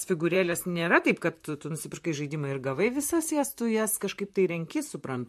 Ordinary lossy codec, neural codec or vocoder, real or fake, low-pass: MP3, 64 kbps; vocoder, 44.1 kHz, 128 mel bands, Pupu-Vocoder; fake; 14.4 kHz